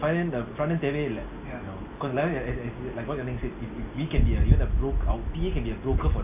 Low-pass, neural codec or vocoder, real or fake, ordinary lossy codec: 3.6 kHz; none; real; AAC, 32 kbps